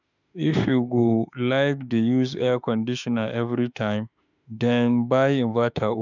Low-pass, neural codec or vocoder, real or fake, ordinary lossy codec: 7.2 kHz; autoencoder, 48 kHz, 32 numbers a frame, DAC-VAE, trained on Japanese speech; fake; none